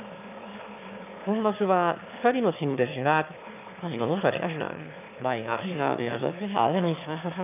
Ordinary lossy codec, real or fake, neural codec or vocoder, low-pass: none; fake; autoencoder, 22.05 kHz, a latent of 192 numbers a frame, VITS, trained on one speaker; 3.6 kHz